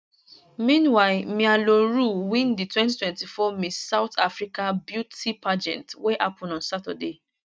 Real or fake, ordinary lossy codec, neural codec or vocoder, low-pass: real; none; none; none